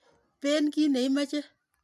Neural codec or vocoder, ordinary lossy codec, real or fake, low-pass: none; none; real; 14.4 kHz